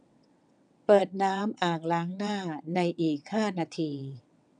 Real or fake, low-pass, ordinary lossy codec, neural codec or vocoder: fake; 9.9 kHz; none; vocoder, 22.05 kHz, 80 mel bands, WaveNeXt